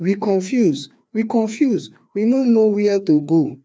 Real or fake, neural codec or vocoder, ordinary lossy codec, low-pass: fake; codec, 16 kHz, 2 kbps, FreqCodec, larger model; none; none